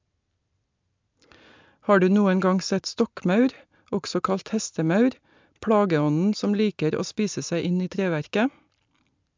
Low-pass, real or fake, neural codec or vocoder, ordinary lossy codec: 7.2 kHz; real; none; MP3, 64 kbps